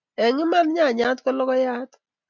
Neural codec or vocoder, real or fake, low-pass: none; real; 7.2 kHz